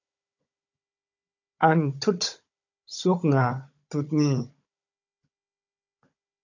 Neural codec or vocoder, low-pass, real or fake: codec, 16 kHz, 16 kbps, FunCodec, trained on Chinese and English, 50 frames a second; 7.2 kHz; fake